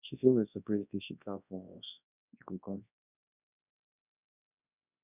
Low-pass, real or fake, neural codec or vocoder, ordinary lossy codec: 3.6 kHz; fake; codec, 24 kHz, 0.9 kbps, WavTokenizer, large speech release; none